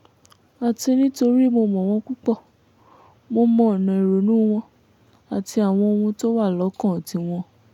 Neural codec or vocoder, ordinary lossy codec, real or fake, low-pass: none; none; real; 19.8 kHz